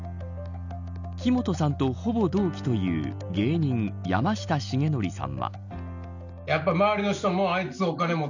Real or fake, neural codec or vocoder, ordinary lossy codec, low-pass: real; none; none; 7.2 kHz